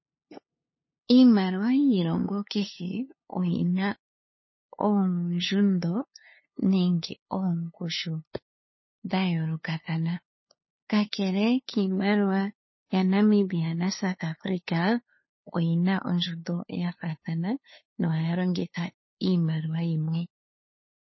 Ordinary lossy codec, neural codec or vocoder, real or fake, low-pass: MP3, 24 kbps; codec, 16 kHz, 2 kbps, FunCodec, trained on LibriTTS, 25 frames a second; fake; 7.2 kHz